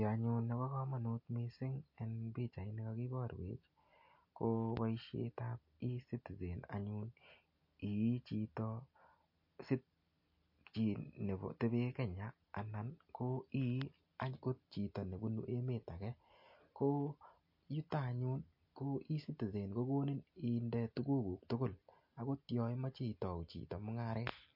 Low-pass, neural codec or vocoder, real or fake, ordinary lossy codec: 5.4 kHz; none; real; MP3, 32 kbps